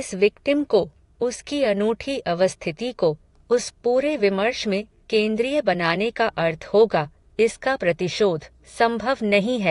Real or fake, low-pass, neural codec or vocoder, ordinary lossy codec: fake; 10.8 kHz; vocoder, 24 kHz, 100 mel bands, Vocos; AAC, 48 kbps